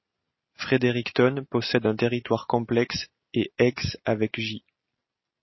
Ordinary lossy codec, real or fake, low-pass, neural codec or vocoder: MP3, 24 kbps; real; 7.2 kHz; none